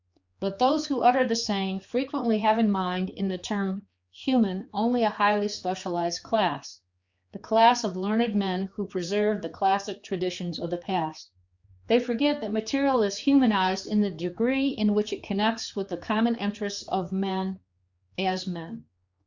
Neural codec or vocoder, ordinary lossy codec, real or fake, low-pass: codec, 16 kHz, 4 kbps, X-Codec, HuBERT features, trained on general audio; Opus, 64 kbps; fake; 7.2 kHz